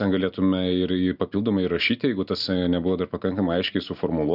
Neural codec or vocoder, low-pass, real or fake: none; 5.4 kHz; real